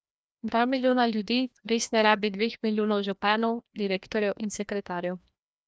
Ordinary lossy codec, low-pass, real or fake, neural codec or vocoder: none; none; fake; codec, 16 kHz, 1 kbps, FreqCodec, larger model